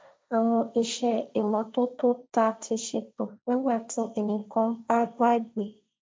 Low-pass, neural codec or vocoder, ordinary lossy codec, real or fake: none; codec, 16 kHz, 1.1 kbps, Voila-Tokenizer; none; fake